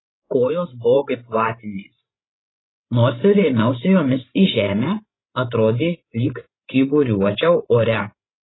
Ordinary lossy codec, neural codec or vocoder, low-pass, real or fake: AAC, 16 kbps; codec, 16 kHz, 16 kbps, FreqCodec, larger model; 7.2 kHz; fake